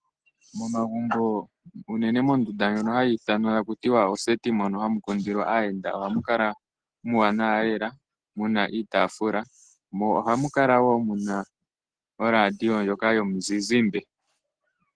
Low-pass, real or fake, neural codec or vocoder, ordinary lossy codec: 9.9 kHz; real; none; Opus, 16 kbps